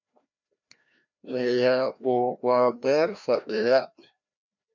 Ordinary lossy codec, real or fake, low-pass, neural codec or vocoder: MP3, 48 kbps; fake; 7.2 kHz; codec, 16 kHz, 1 kbps, FreqCodec, larger model